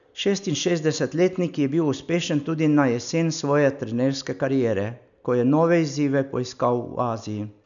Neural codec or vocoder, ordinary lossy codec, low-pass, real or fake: none; none; 7.2 kHz; real